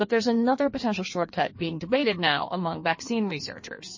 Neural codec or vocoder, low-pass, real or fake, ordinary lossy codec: codec, 16 kHz in and 24 kHz out, 1.1 kbps, FireRedTTS-2 codec; 7.2 kHz; fake; MP3, 32 kbps